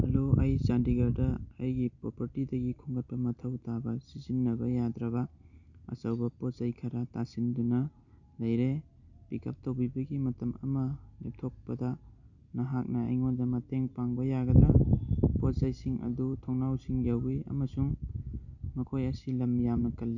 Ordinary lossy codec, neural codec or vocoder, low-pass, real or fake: none; none; 7.2 kHz; real